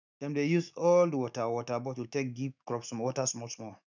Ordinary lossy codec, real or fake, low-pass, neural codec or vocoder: none; fake; 7.2 kHz; autoencoder, 48 kHz, 128 numbers a frame, DAC-VAE, trained on Japanese speech